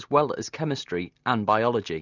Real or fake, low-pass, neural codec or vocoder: real; 7.2 kHz; none